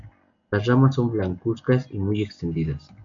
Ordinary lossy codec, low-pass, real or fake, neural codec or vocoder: AAC, 64 kbps; 7.2 kHz; real; none